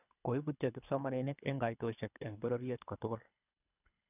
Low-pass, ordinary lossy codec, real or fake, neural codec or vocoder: 3.6 kHz; none; fake; codec, 24 kHz, 3 kbps, HILCodec